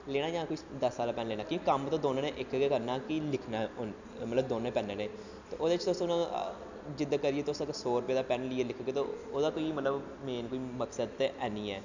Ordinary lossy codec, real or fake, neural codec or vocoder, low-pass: none; real; none; 7.2 kHz